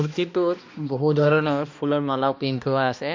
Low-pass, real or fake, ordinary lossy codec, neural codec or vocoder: 7.2 kHz; fake; MP3, 48 kbps; codec, 16 kHz, 1 kbps, X-Codec, HuBERT features, trained on balanced general audio